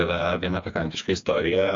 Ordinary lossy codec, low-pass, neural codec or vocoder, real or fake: AAC, 64 kbps; 7.2 kHz; codec, 16 kHz, 2 kbps, FreqCodec, smaller model; fake